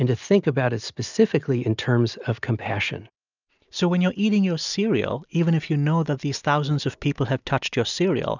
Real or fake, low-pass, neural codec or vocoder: real; 7.2 kHz; none